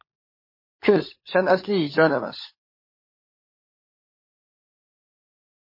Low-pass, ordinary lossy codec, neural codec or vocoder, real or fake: 5.4 kHz; MP3, 24 kbps; codec, 16 kHz, 16 kbps, FunCodec, trained on LibriTTS, 50 frames a second; fake